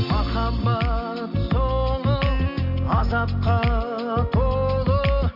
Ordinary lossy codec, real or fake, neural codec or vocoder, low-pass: MP3, 48 kbps; real; none; 5.4 kHz